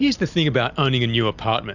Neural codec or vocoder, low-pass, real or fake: none; 7.2 kHz; real